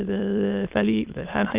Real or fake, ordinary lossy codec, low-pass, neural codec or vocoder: fake; Opus, 24 kbps; 3.6 kHz; autoencoder, 22.05 kHz, a latent of 192 numbers a frame, VITS, trained on many speakers